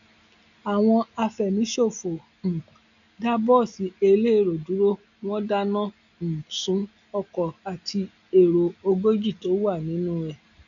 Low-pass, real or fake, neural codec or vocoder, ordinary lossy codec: 7.2 kHz; real; none; none